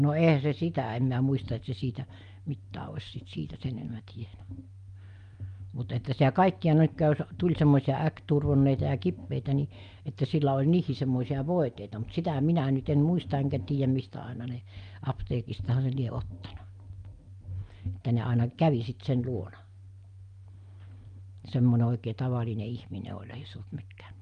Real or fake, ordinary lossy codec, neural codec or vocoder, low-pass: real; Opus, 32 kbps; none; 9.9 kHz